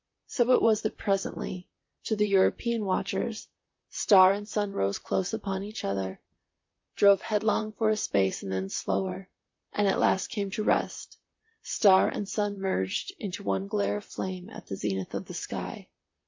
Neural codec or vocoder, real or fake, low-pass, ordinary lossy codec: vocoder, 44.1 kHz, 128 mel bands, Pupu-Vocoder; fake; 7.2 kHz; MP3, 48 kbps